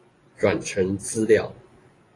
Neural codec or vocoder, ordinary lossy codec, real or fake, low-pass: vocoder, 24 kHz, 100 mel bands, Vocos; AAC, 32 kbps; fake; 10.8 kHz